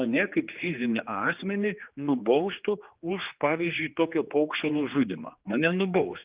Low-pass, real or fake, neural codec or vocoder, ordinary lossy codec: 3.6 kHz; fake; codec, 16 kHz, 2 kbps, X-Codec, HuBERT features, trained on general audio; Opus, 24 kbps